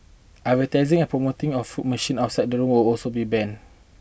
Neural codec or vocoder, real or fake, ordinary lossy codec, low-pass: none; real; none; none